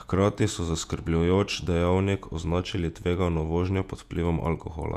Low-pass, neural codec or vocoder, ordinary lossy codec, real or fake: 14.4 kHz; vocoder, 48 kHz, 128 mel bands, Vocos; none; fake